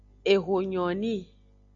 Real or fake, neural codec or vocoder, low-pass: real; none; 7.2 kHz